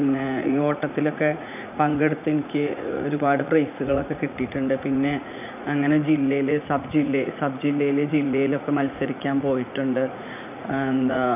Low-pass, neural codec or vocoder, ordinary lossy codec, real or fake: 3.6 kHz; vocoder, 44.1 kHz, 80 mel bands, Vocos; none; fake